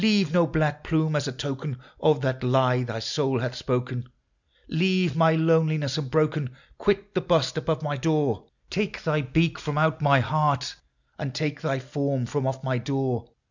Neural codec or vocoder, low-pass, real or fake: none; 7.2 kHz; real